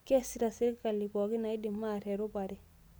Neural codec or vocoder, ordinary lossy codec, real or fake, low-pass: none; none; real; none